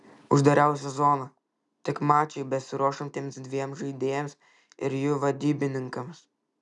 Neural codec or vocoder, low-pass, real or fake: none; 10.8 kHz; real